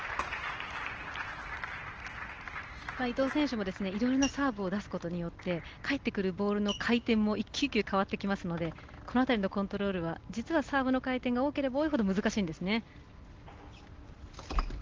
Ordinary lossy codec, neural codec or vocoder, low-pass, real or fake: Opus, 16 kbps; none; 7.2 kHz; real